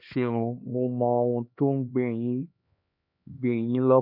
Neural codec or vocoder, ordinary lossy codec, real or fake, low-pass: codec, 16 kHz, 2 kbps, X-Codec, HuBERT features, trained on LibriSpeech; none; fake; 5.4 kHz